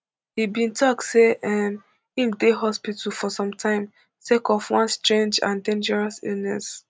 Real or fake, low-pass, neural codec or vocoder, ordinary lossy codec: real; none; none; none